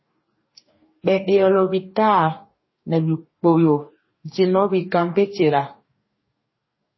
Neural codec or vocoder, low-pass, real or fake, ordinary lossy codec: codec, 44.1 kHz, 2.6 kbps, DAC; 7.2 kHz; fake; MP3, 24 kbps